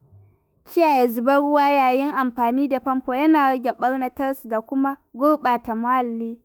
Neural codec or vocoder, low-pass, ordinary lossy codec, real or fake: autoencoder, 48 kHz, 32 numbers a frame, DAC-VAE, trained on Japanese speech; none; none; fake